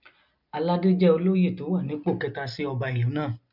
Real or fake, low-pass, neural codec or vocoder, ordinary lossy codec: real; 5.4 kHz; none; none